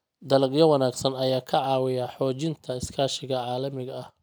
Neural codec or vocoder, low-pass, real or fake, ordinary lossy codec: none; none; real; none